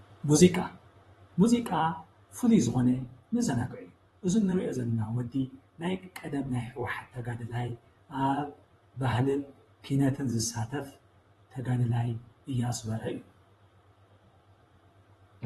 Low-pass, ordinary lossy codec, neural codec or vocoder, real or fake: 19.8 kHz; AAC, 32 kbps; vocoder, 44.1 kHz, 128 mel bands, Pupu-Vocoder; fake